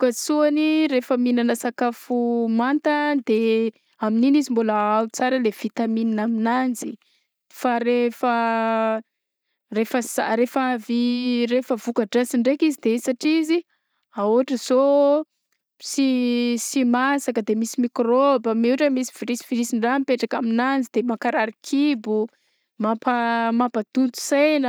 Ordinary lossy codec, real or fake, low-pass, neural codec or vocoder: none; real; none; none